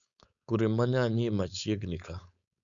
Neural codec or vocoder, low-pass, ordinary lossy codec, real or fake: codec, 16 kHz, 4.8 kbps, FACodec; 7.2 kHz; none; fake